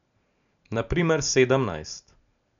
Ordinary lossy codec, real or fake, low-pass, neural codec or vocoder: none; real; 7.2 kHz; none